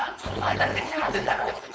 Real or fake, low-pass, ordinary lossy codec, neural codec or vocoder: fake; none; none; codec, 16 kHz, 4.8 kbps, FACodec